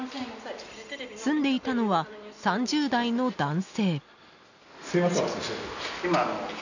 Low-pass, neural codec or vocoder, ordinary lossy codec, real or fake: 7.2 kHz; none; none; real